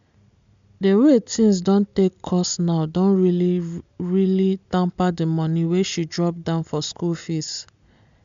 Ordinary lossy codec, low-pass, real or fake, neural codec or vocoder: MP3, 64 kbps; 7.2 kHz; real; none